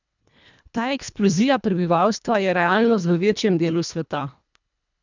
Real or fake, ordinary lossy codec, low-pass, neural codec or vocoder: fake; none; 7.2 kHz; codec, 24 kHz, 1.5 kbps, HILCodec